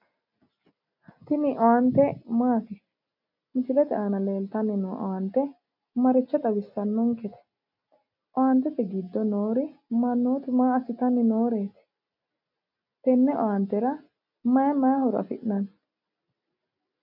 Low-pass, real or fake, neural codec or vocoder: 5.4 kHz; real; none